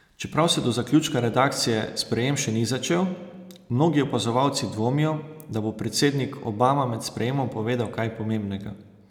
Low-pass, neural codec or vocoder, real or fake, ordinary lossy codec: 19.8 kHz; none; real; none